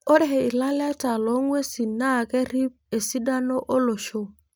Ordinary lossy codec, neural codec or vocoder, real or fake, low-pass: none; none; real; none